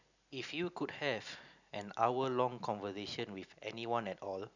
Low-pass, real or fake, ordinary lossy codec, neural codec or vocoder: 7.2 kHz; real; none; none